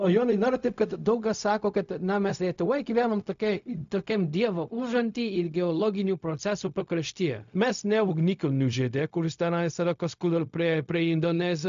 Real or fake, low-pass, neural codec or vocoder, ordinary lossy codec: fake; 7.2 kHz; codec, 16 kHz, 0.4 kbps, LongCat-Audio-Codec; MP3, 64 kbps